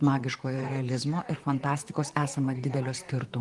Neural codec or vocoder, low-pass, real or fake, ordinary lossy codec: vocoder, 44.1 kHz, 128 mel bands, Pupu-Vocoder; 10.8 kHz; fake; Opus, 24 kbps